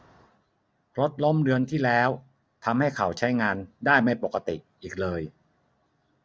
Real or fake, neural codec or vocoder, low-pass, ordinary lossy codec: real; none; none; none